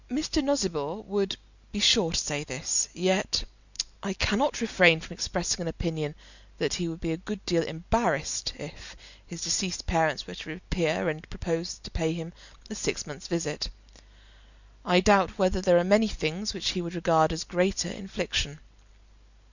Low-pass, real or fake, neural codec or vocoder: 7.2 kHz; real; none